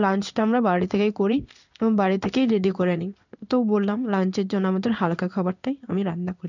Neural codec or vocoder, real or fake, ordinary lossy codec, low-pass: codec, 16 kHz in and 24 kHz out, 1 kbps, XY-Tokenizer; fake; none; 7.2 kHz